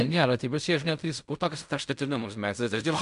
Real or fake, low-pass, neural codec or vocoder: fake; 10.8 kHz; codec, 16 kHz in and 24 kHz out, 0.4 kbps, LongCat-Audio-Codec, fine tuned four codebook decoder